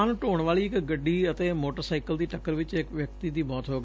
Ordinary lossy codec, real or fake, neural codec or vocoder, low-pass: none; real; none; none